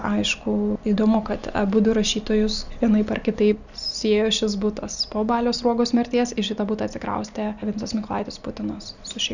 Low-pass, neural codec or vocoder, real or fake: 7.2 kHz; none; real